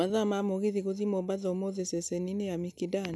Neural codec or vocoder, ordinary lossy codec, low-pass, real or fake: none; none; none; real